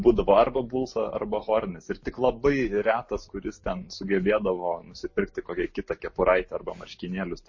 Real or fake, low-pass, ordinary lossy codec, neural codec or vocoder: real; 7.2 kHz; MP3, 32 kbps; none